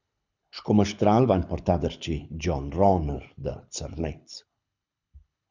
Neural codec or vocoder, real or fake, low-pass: codec, 24 kHz, 6 kbps, HILCodec; fake; 7.2 kHz